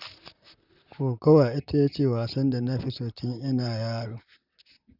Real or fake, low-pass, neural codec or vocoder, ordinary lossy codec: fake; 5.4 kHz; vocoder, 44.1 kHz, 128 mel bands, Pupu-Vocoder; none